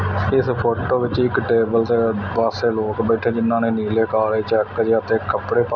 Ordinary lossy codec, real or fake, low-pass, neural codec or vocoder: none; real; none; none